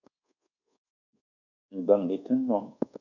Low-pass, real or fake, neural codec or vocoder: 7.2 kHz; fake; codec, 24 kHz, 1.2 kbps, DualCodec